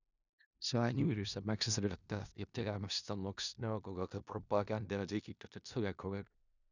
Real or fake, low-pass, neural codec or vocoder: fake; 7.2 kHz; codec, 16 kHz in and 24 kHz out, 0.4 kbps, LongCat-Audio-Codec, four codebook decoder